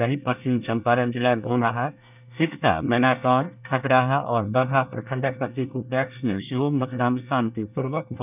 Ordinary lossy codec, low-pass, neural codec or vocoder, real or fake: none; 3.6 kHz; codec, 24 kHz, 1 kbps, SNAC; fake